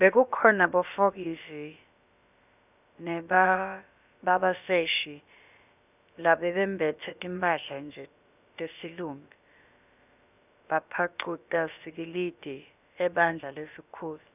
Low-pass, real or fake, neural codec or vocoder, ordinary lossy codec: 3.6 kHz; fake; codec, 16 kHz, about 1 kbps, DyCAST, with the encoder's durations; none